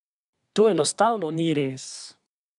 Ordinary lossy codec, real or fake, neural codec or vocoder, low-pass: none; fake; codec, 24 kHz, 1 kbps, SNAC; 10.8 kHz